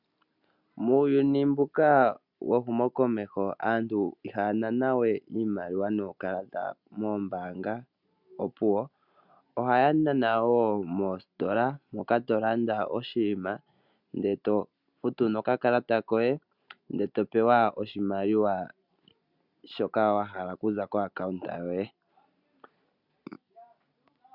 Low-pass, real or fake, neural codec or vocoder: 5.4 kHz; real; none